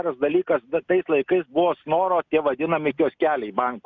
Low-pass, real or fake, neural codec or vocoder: 7.2 kHz; real; none